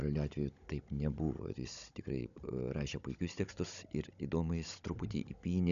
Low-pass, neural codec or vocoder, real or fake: 7.2 kHz; codec, 16 kHz, 16 kbps, FunCodec, trained on Chinese and English, 50 frames a second; fake